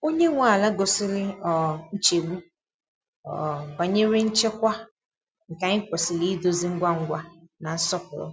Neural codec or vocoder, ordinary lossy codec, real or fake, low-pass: none; none; real; none